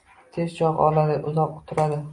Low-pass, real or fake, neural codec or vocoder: 10.8 kHz; real; none